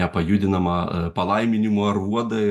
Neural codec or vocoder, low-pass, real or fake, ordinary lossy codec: none; 14.4 kHz; real; AAC, 96 kbps